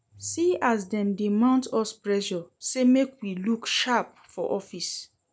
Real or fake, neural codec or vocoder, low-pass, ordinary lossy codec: real; none; none; none